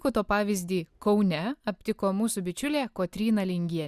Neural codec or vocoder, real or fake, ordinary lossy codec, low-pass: none; real; Opus, 64 kbps; 14.4 kHz